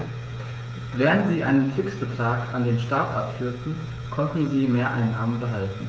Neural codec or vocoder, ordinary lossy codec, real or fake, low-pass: codec, 16 kHz, 8 kbps, FreqCodec, smaller model; none; fake; none